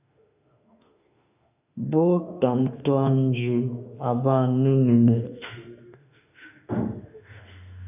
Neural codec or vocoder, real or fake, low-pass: autoencoder, 48 kHz, 32 numbers a frame, DAC-VAE, trained on Japanese speech; fake; 3.6 kHz